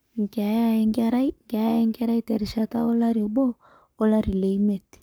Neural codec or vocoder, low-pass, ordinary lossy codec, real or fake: codec, 44.1 kHz, 7.8 kbps, Pupu-Codec; none; none; fake